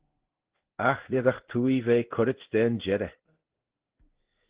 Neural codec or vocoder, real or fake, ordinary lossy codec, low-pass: codec, 16 kHz in and 24 kHz out, 1 kbps, XY-Tokenizer; fake; Opus, 16 kbps; 3.6 kHz